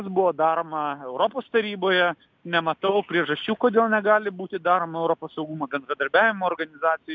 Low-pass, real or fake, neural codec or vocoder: 7.2 kHz; real; none